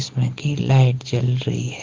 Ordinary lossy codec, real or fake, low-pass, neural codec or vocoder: Opus, 16 kbps; real; 7.2 kHz; none